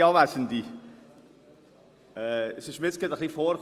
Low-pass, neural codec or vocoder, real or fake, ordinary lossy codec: 14.4 kHz; none; real; Opus, 32 kbps